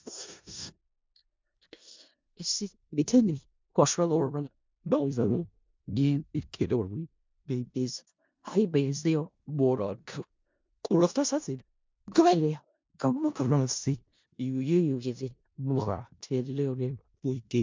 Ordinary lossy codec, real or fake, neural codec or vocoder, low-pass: MP3, 64 kbps; fake; codec, 16 kHz in and 24 kHz out, 0.4 kbps, LongCat-Audio-Codec, four codebook decoder; 7.2 kHz